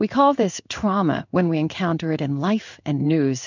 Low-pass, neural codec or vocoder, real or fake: 7.2 kHz; codec, 16 kHz in and 24 kHz out, 1 kbps, XY-Tokenizer; fake